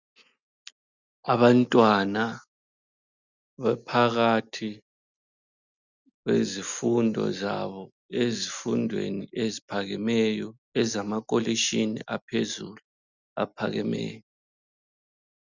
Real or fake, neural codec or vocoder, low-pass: real; none; 7.2 kHz